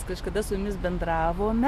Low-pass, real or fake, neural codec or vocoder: 14.4 kHz; real; none